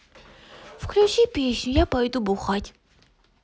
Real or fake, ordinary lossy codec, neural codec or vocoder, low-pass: real; none; none; none